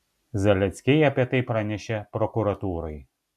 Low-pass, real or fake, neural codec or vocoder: 14.4 kHz; real; none